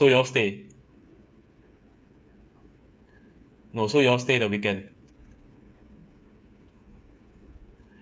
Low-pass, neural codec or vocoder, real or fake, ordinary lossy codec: none; codec, 16 kHz, 8 kbps, FreqCodec, smaller model; fake; none